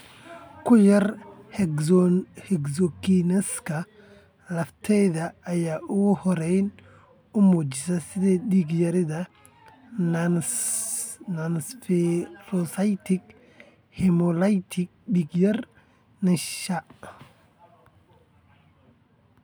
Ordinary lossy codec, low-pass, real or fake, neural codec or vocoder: none; none; real; none